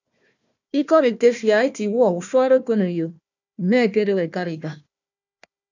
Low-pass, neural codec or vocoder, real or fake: 7.2 kHz; codec, 16 kHz, 1 kbps, FunCodec, trained on Chinese and English, 50 frames a second; fake